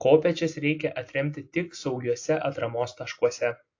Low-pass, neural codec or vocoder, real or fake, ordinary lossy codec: 7.2 kHz; none; real; AAC, 48 kbps